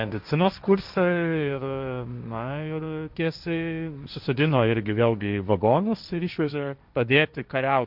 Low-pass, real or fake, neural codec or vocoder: 5.4 kHz; fake; codec, 16 kHz, 1.1 kbps, Voila-Tokenizer